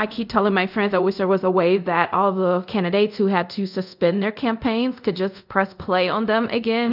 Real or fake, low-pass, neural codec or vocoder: fake; 5.4 kHz; codec, 24 kHz, 0.5 kbps, DualCodec